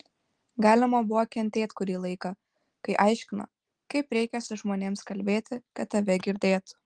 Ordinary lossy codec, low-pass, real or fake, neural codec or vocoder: Opus, 24 kbps; 9.9 kHz; real; none